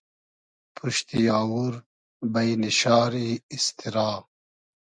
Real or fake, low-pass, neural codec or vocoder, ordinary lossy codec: real; 9.9 kHz; none; MP3, 96 kbps